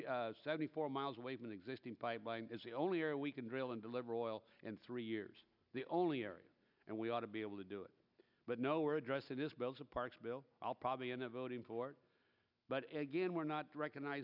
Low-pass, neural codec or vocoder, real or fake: 5.4 kHz; none; real